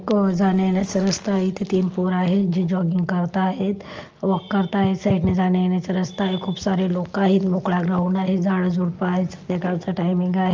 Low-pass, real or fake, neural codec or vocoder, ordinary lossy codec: 7.2 kHz; real; none; Opus, 24 kbps